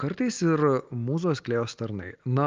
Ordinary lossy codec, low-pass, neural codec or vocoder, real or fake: Opus, 24 kbps; 7.2 kHz; none; real